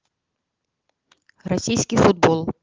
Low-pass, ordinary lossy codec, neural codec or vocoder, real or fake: 7.2 kHz; Opus, 16 kbps; none; real